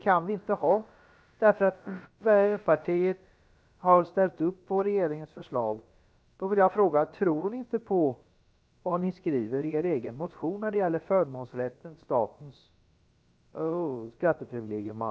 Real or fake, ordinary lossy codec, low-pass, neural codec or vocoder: fake; none; none; codec, 16 kHz, about 1 kbps, DyCAST, with the encoder's durations